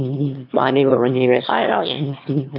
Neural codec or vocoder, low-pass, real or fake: autoencoder, 22.05 kHz, a latent of 192 numbers a frame, VITS, trained on one speaker; 5.4 kHz; fake